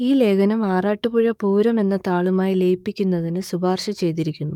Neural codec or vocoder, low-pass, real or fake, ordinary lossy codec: codec, 44.1 kHz, 7.8 kbps, DAC; 19.8 kHz; fake; none